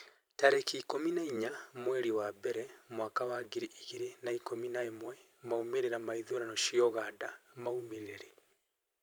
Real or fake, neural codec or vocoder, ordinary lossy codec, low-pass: fake; vocoder, 44.1 kHz, 128 mel bands every 512 samples, BigVGAN v2; none; none